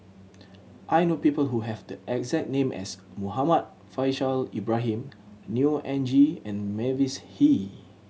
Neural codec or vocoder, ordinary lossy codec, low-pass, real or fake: none; none; none; real